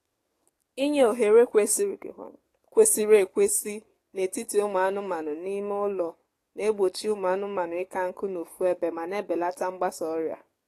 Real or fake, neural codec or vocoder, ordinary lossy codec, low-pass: fake; codec, 44.1 kHz, 7.8 kbps, DAC; AAC, 48 kbps; 14.4 kHz